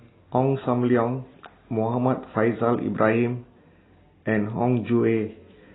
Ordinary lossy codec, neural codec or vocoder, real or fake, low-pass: AAC, 16 kbps; none; real; 7.2 kHz